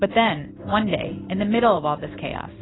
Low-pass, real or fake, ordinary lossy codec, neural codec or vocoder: 7.2 kHz; fake; AAC, 16 kbps; codec, 16 kHz in and 24 kHz out, 1 kbps, XY-Tokenizer